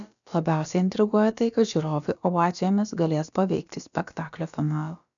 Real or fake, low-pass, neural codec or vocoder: fake; 7.2 kHz; codec, 16 kHz, about 1 kbps, DyCAST, with the encoder's durations